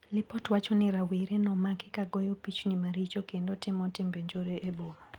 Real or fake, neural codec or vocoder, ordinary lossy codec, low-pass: real; none; Opus, 32 kbps; 19.8 kHz